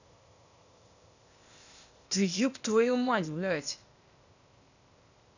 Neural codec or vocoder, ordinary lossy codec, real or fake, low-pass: codec, 16 kHz, 0.8 kbps, ZipCodec; none; fake; 7.2 kHz